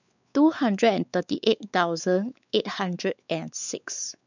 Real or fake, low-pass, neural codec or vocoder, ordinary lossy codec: fake; 7.2 kHz; codec, 16 kHz, 4 kbps, X-Codec, WavLM features, trained on Multilingual LibriSpeech; none